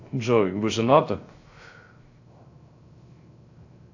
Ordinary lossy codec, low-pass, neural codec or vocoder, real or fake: AAC, 48 kbps; 7.2 kHz; codec, 16 kHz, 0.3 kbps, FocalCodec; fake